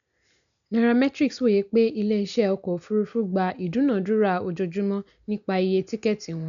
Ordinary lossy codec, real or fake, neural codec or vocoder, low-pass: none; real; none; 7.2 kHz